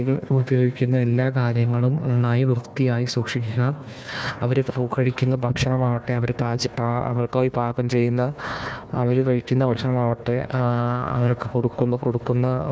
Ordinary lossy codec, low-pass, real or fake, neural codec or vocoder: none; none; fake; codec, 16 kHz, 1 kbps, FunCodec, trained on Chinese and English, 50 frames a second